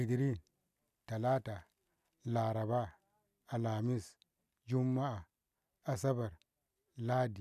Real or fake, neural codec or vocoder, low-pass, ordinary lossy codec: real; none; 14.4 kHz; none